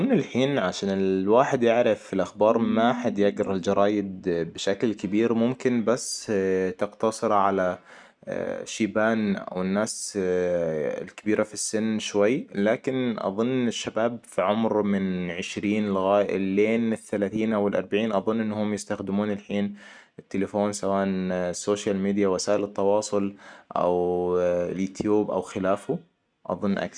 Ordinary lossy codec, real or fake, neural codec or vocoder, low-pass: none; real; none; 9.9 kHz